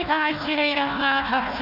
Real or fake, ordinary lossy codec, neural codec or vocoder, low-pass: fake; none; codec, 16 kHz, 1 kbps, FunCodec, trained on Chinese and English, 50 frames a second; 5.4 kHz